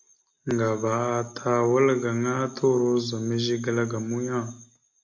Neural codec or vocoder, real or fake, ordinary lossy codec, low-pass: none; real; MP3, 48 kbps; 7.2 kHz